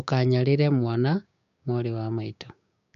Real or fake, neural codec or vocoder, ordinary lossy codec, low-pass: fake; codec, 16 kHz, 6 kbps, DAC; AAC, 96 kbps; 7.2 kHz